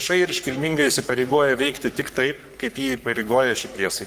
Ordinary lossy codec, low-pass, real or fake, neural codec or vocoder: Opus, 32 kbps; 14.4 kHz; fake; codec, 44.1 kHz, 3.4 kbps, Pupu-Codec